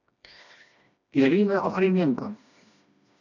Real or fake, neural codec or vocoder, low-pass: fake; codec, 16 kHz, 1 kbps, FreqCodec, smaller model; 7.2 kHz